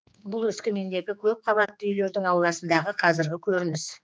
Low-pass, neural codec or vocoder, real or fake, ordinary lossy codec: none; codec, 16 kHz, 2 kbps, X-Codec, HuBERT features, trained on general audio; fake; none